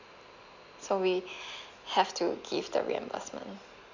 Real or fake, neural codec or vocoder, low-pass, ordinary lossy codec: real; none; 7.2 kHz; none